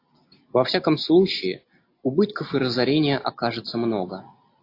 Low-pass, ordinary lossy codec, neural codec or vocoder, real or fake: 5.4 kHz; AAC, 32 kbps; none; real